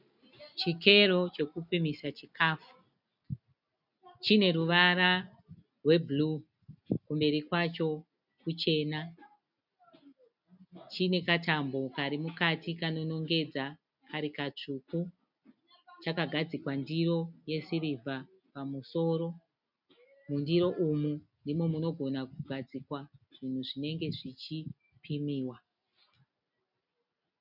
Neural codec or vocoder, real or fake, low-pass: none; real; 5.4 kHz